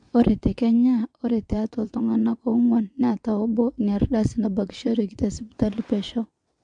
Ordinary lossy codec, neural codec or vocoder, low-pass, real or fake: MP3, 64 kbps; vocoder, 22.05 kHz, 80 mel bands, WaveNeXt; 9.9 kHz; fake